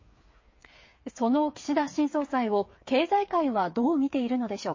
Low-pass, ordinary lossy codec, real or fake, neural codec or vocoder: 7.2 kHz; MP3, 32 kbps; fake; codec, 16 kHz, 8 kbps, FreqCodec, smaller model